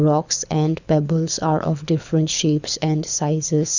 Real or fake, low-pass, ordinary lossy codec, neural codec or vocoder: fake; 7.2 kHz; none; codec, 24 kHz, 6 kbps, HILCodec